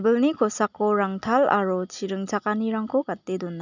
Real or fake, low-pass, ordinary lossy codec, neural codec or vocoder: real; 7.2 kHz; none; none